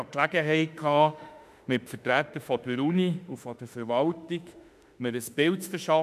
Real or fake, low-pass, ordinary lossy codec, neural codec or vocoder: fake; 14.4 kHz; none; autoencoder, 48 kHz, 32 numbers a frame, DAC-VAE, trained on Japanese speech